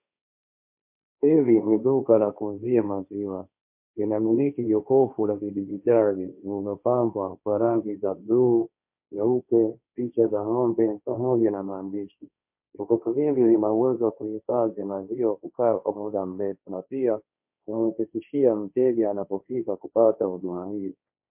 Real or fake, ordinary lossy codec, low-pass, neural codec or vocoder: fake; MP3, 32 kbps; 3.6 kHz; codec, 16 kHz, 1.1 kbps, Voila-Tokenizer